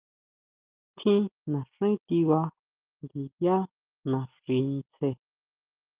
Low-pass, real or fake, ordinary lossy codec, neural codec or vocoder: 3.6 kHz; real; Opus, 24 kbps; none